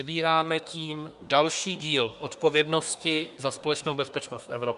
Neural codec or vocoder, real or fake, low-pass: codec, 24 kHz, 1 kbps, SNAC; fake; 10.8 kHz